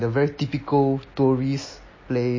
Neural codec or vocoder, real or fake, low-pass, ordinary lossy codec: none; real; 7.2 kHz; MP3, 32 kbps